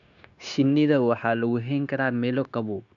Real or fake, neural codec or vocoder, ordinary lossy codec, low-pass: fake; codec, 16 kHz, 0.9 kbps, LongCat-Audio-Codec; MP3, 96 kbps; 7.2 kHz